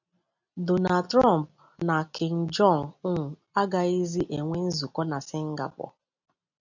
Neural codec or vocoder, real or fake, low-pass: none; real; 7.2 kHz